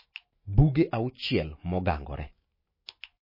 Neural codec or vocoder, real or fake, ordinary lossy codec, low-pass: autoencoder, 48 kHz, 128 numbers a frame, DAC-VAE, trained on Japanese speech; fake; MP3, 24 kbps; 5.4 kHz